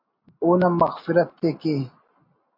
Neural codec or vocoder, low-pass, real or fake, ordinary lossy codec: none; 5.4 kHz; real; MP3, 32 kbps